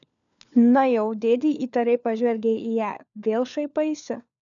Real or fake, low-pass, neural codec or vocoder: fake; 7.2 kHz; codec, 16 kHz, 4 kbps, FunCodec, trained on LibriTTS, 50 frames a second